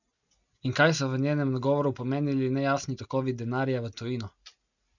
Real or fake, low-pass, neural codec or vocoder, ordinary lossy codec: real; 7.2 kHz; none; none